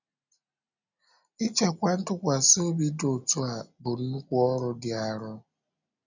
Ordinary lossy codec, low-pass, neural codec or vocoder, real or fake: none; 7.2 kHz; none; real